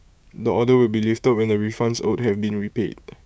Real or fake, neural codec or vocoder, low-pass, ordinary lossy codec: fake; codec, 16 kHz, 6 kbps, DAC; none; none